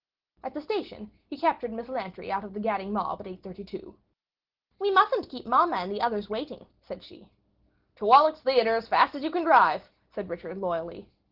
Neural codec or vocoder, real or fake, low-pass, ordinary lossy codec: none; real; 5.4 kHz; Opus, 16 kbps